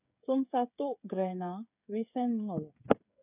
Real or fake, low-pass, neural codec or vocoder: fake; 3.6 kHz; codec, 16 kHz, 8 kbps, FreqCodec, smaller model